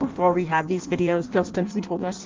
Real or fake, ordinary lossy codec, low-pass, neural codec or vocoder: fake; Opus, 24 kbps; 7.2 kHz; codec, 16 kHz in and 24 kHz out, 0.6 kbps, FireRedTTS-2 codec